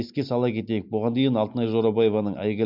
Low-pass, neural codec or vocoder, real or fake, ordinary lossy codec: 5.4 kHz; none; real; MP3, 48 kbps